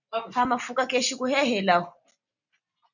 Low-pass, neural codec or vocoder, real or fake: 7.2 kHz; none; real